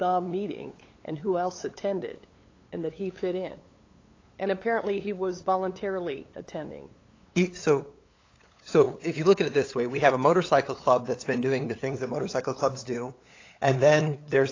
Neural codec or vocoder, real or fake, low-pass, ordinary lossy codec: codec, 16 kHz, 8 kbps, FunCodec, trained on LibriTTS, 25 frames a second; fake; 7.2 kHz; AAC, 32 kbps